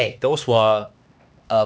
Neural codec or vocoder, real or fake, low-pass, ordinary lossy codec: codec, 16 kHz, 2 kbps, X-Codec, HuBERT features, trained on LibriSpeech; fake; none; none